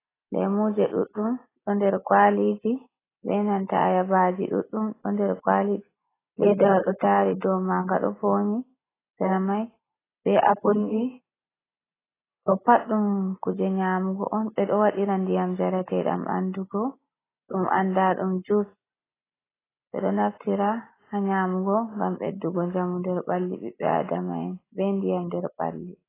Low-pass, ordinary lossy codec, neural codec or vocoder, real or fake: 3.6 kHz; AAC, 16 kbps; none; real